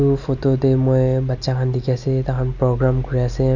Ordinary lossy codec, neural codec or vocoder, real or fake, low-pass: none; none; real; 7.2 kHz